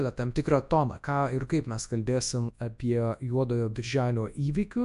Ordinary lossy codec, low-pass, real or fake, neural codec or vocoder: AAC, 96 kbps; 10.8 kHz; fake; codec, 24 kHz, 0.9 kbps, WavTokenizer, large speech release